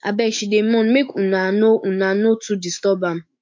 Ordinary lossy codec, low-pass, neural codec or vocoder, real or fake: MP3, 48 kbps; 7.2 kHz; autoencoder, 48 kHz, 128 numbers a frame, DAC-VAE, trained on Japanese speech; fake